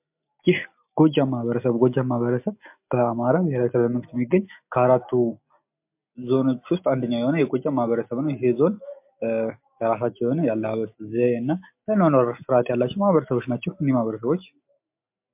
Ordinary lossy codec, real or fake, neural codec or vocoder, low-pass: MP3, 32 kbps; real; none; 3.6 kHz